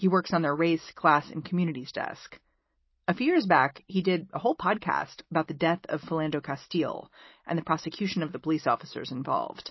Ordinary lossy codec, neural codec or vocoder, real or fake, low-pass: MP3, 24 kbps; none; real; 7.2 kHz